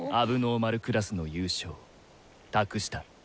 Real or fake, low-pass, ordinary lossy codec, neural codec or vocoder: real; none; none; none